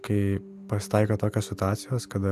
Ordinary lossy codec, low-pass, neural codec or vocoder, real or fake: AAC, 96 kbps; 14.4 kHz; none; real